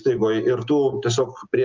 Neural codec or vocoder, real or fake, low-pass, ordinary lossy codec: none; real; 7.2 kHz; Opus, 32 kbps